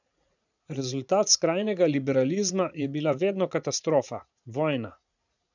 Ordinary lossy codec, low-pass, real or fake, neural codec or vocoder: none; 7.2 kHz; fake; vocoder, 44.1 kHz, 80 mel bands, Vocos